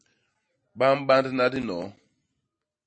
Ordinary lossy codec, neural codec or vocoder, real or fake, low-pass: MP3, 32 kbps; none; real; 9.9 kHz